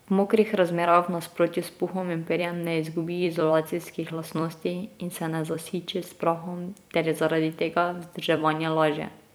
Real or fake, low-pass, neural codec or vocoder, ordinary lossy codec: fake; none; vocoder, 44.1 kHz, 128 mel bands every 256 samples, BigVGAN v2; none